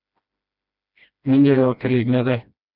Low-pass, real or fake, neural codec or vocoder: 5.4 kHz; fake; codec, 16 kHz, 1 kbps, FreqCodec, smaller model